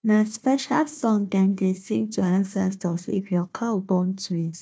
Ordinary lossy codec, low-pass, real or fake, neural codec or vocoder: none; none; fake; codec, 16 kHz, 1 kbps, FunCodec, trained on Chinese and English, 50 frames a second